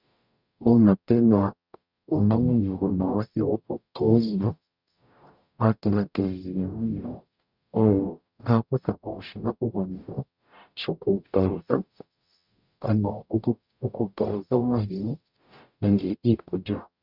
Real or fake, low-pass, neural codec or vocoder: fake; 5.4 kHz; codec, 44.1 kHz, 0.9 kbps, DAC